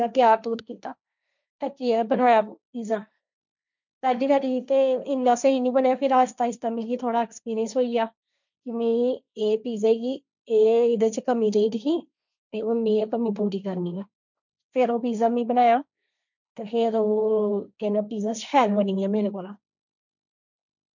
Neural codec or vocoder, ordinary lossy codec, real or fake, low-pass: codec, 16 kHz, 1.1 kbps, Voila-Tokenizer; none; fake; 7.2 kHz